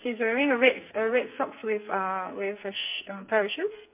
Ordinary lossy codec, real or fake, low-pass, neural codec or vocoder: MP3, 32 kbps; fake; 3.6 kHz; codec, 44.1 kHz, 2.6 kbps, SNAC